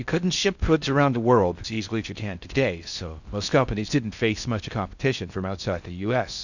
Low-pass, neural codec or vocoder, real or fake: 7.2 kHz; codec, 16 kHz in and 24 kHz out, 0.6 kbps, FocalCodec, streaming, 4096 codes; fake